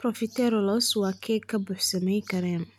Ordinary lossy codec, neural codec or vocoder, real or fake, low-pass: none; none; real; 19.8 kHz